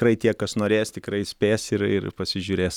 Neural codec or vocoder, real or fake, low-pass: none; real; 19.8 kHz